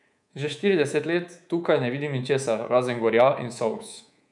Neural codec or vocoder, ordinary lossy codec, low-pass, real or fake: codec, 24 kHz, 3.1 kbps, DualCodec; none; 10.8 kHz; fake